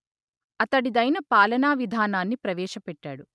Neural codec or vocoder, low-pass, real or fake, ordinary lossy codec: none; none; real; none